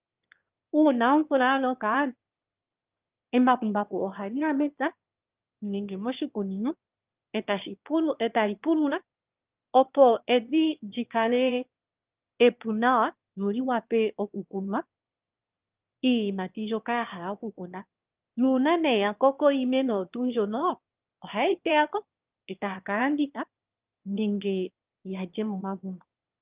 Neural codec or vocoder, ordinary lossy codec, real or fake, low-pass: autoencoder, 22.05 kHz, a latent of 192 numbers a frame, VITS, trained on one speaker; Opus, 24 kbps; fake; 3.6 kHz